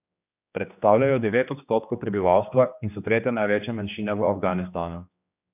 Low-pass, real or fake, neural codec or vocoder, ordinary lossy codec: 3.6 kHz; fake; codec, 16 kHz, 2 kbps, X-Codec, HuBERT features, trained on general audio; none